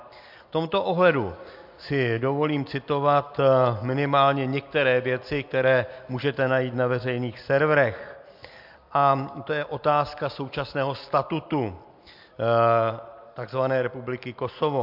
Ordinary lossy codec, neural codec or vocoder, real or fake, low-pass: MP3, 48 kbps; none; real; 5.4 kHz